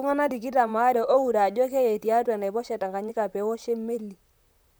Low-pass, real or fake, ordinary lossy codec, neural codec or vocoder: none; fake; none; vocoder, 44.1 kHz, 128 mel bands, Pupu-Vocoder